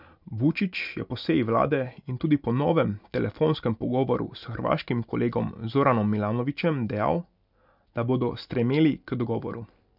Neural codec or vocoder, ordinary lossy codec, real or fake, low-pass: none; none; real; 5.4 kHz